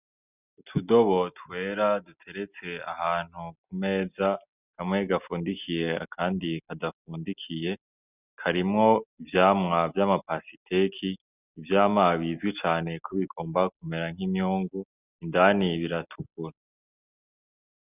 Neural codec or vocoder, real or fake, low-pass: none; real; 3.6 kHz